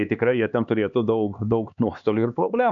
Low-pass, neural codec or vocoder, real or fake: 7.2 kHz; codec, 16 kHz, 2 kbps, X-Codec, HuBERT features, trained on LibriSpeech; fake